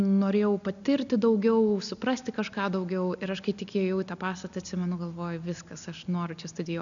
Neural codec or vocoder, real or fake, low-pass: none; real; 7.2 kHz